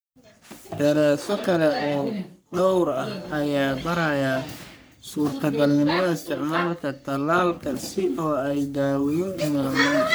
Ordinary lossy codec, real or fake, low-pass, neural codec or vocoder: none; fake; none; codec, 44.1 kHz, 3.4 kbps, Pupu-Codec